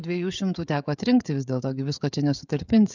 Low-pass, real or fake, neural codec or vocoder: 7.2 kHz; fake; codec, 16 kHz, 16 kbps, FreqCodec, smaller model